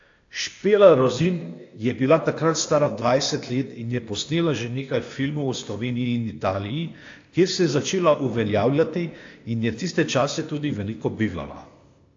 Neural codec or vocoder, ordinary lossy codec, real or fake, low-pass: codec, 16 kHz, 0.8 kbps, ZipCodec; AAC, 48 kbps; fake; 7.2 kHz